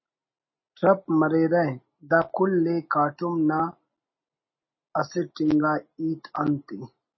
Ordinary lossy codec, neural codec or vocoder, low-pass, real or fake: MP3, 24 kbps; none; 7.2 kHz; real